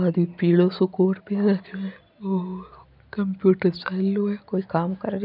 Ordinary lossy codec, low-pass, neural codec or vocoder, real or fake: none; 5.4 kHz; none; real